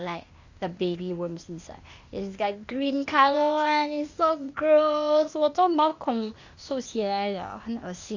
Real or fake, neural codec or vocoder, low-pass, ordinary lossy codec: fake; codec, 16 kHz, 0.8 kbps, ZipCodec; 7.2 kHz; Opus, 64 kbps